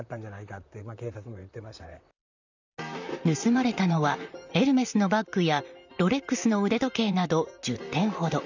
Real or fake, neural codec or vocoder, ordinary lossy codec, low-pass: fake; vocoder, 44.1 kHz, 128 mel bands, Pupu-Vocoder; none; 7.2 kHz